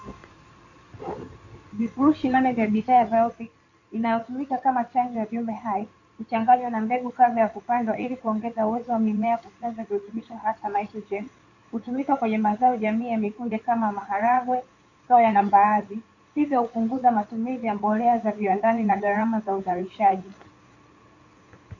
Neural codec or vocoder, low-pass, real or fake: codec, 16 kHz in and 24 kHz out, 2.2 kbps, FireRedTTS-2 codec; 7.2 kHz; fake